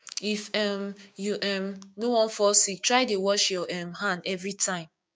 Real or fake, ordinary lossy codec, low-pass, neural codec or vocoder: fake; none; none; codec, 16 kHz, 6 kbps, DAC